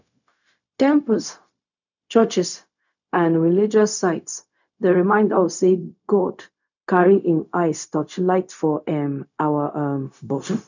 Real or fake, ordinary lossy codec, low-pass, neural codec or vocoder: fake; none; 7.2 kHz; codec, 16 kHz, 0.4 kbps, LongCat-Audio-Codec